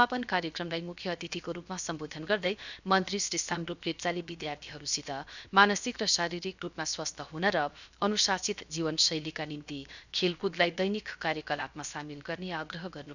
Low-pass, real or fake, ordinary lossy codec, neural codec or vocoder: 7.2 kHz; fake; none; codec, 16 kHz, 0.7 kbps, FocalCodec